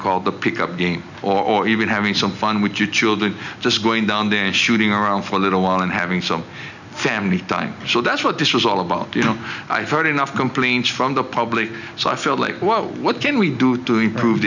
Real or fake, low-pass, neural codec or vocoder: real; 7.2 kHz; none